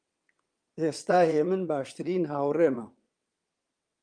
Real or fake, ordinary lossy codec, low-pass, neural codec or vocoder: fake; Opus, 32 kbps; 9.9 kHz; vocoder, 22.05 kHz, 80 mel bands, WaveNeXt